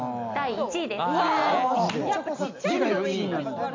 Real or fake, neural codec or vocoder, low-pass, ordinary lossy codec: real; none; 7.2 kHz; MP3, 64 kbps